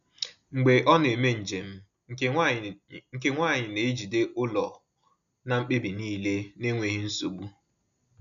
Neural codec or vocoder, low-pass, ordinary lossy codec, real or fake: none; 7.2 kHz; none; real